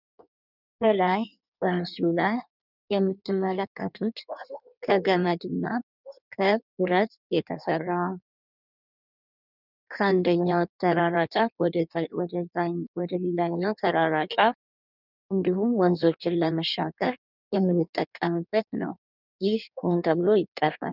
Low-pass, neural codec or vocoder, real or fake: 5.4 kHz; codec, 16 kHz in and 24 kHz out, 1.1 kbps, FireRedTTS-2 codec; fake